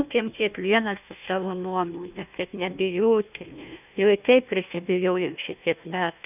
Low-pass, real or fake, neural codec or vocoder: 3.6 kHz; fake; codec, 16 kHz, 1 kbps, FunCodec, trained on Chinese and English, 50 frames a second